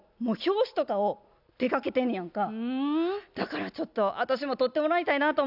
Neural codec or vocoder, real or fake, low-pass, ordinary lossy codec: none; real; 5.4 kHz; none